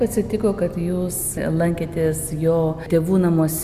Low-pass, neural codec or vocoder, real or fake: 14.4 kHz; none; real